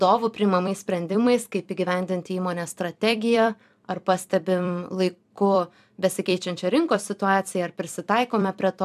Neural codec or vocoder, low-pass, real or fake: vocoder, 44.1 kHz, 128 mel bands every 256 samples, BigVGAN v2; 14.4 kHz; fake